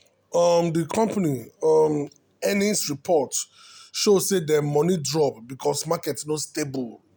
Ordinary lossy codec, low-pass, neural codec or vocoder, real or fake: none; none; none; real